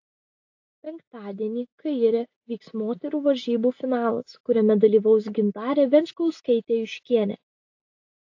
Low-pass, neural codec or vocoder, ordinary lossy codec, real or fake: 7.2 kHz; none; AAC, 48 kbps; real